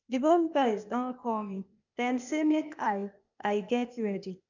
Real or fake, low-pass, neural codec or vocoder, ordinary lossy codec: fake; 7.2 kHz; codec, 16 kHz, 0.8 kbps, ZipCodec; none